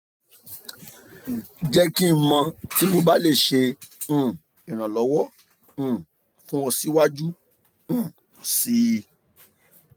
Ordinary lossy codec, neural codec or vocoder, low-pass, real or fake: none; none; none; real